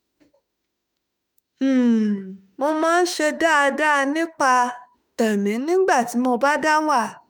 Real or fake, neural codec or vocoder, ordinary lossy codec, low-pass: fake; autoencoder, 48 kHz, 32 numbers a frame, DAC-VAE, trained on Japanese speech; none; none